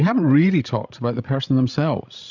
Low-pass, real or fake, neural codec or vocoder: 7.2 kHz; fake; codec, 16 kHz, 16 kbps, FreqCodec, larger model